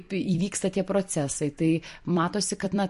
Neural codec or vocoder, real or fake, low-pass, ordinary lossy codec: vocoder, 44.1 kHz, 128 mel bands every 256 samples, BigVGAN v2; fake; 14.4 kHz; MP3, 48 kbps